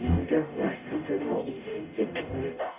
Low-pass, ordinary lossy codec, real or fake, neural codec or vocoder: 3.6 kHz; AAC, 32 kbps; fake; codec, 44.1 kHz, 0.9 kbps, DAC